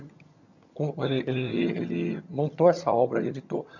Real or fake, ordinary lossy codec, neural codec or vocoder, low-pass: fake; none; vocoder, 22.05 kHz, 80 mel bands, HiFi-GAN; 7.2 kHz